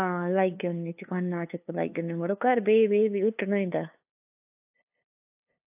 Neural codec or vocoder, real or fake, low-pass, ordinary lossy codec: codec, 16 kHz, 2 kbps, FunCodec, trained on LibriTTS, 25 frames a second; fake; 3.6 kHz; AAC, 32 kbps